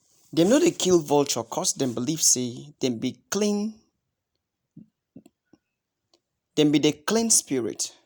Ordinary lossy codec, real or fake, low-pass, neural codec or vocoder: none; real; none; none